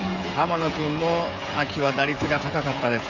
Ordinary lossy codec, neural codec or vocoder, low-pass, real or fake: none; codec, 16 kHz, 16 kbps, FreqCodec, smaller model; 7.2 kHz; fake